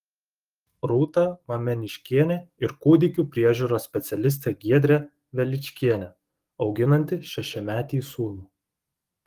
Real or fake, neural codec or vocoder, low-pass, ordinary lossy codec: fake; codec, 44.1 kHz, 7.8 kbps, DAC; 14.4 kHz; Opus, 24 kbps